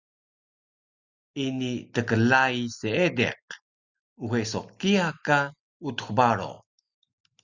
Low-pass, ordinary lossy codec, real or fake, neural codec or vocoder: 7.2 kHz; Opus, 64 kbps; real; none